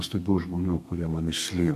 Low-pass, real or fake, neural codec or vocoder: 14.4 kHz; fake; codec, 32 kHz, 1.9 kbps, SNAC